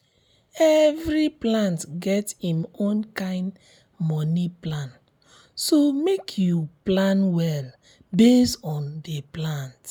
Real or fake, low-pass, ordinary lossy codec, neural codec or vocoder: real; none; none; none